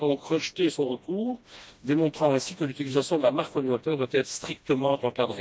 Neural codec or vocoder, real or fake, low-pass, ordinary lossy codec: codec, 16 kHz, 1 kbps, FreqCodec, smaller model; fake; none; none